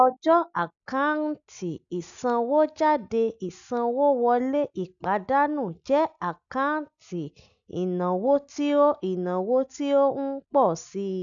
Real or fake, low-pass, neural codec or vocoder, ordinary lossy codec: real; 7.2 kHz; none; none